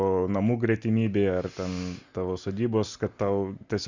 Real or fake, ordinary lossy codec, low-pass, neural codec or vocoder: real; Opus, 64 kbps; 7.2 kHz; none